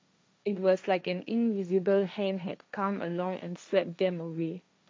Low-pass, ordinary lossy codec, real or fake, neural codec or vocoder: none; none; fake; codec, 16 kHz, 1.1 kbps, Voila-Tokenizer